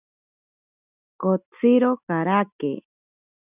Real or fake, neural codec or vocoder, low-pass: real; none; 3.6 kHz